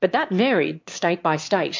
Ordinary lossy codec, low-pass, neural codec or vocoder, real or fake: MP3, 48 kbps; 7.2 kHz; autoencoder, 22.05 kHz, a latent of 192 numbers a frame, VITS, trained on one speaker; fake